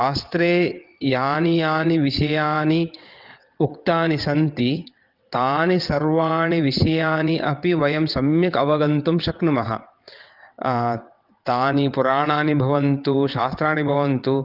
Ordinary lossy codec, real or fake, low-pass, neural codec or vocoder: Opus, 32 kbps; fake; 5.4 kHz; vocoder, 22.05 kHz, 80 mel bands, WaveNeXt